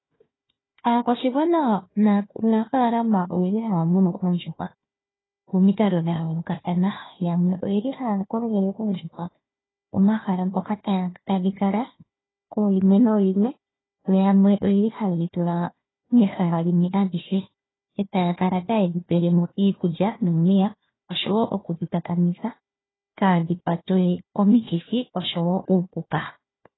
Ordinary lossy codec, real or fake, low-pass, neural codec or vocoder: AAC, 16 kbps; fake; 7.2 kHz; codec, 16 kHz, 1 kbps, FunCodec, trained on Chinese and English, 50 frames a second